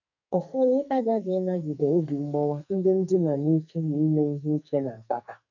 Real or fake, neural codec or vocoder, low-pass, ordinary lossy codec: fake; codec, 44.1 kHz, 2.6 kbps, SNAC; 7.2 kHz; none